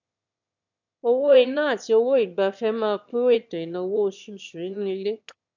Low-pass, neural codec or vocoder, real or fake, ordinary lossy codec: 7.2 kHz; autoencoder, 22.05 kHz, a latent of 192 numbers a frame, VITS, trained on one speaker; fake; none